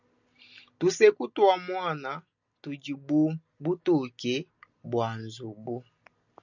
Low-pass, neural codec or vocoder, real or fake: 7.2 kHz; none; real